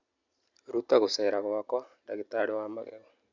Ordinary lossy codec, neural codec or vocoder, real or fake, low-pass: none; none; real; 7.2 kHz